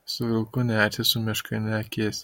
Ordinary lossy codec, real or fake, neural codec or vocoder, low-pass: MP3, 64 kbps; real; none; 19.8 kHz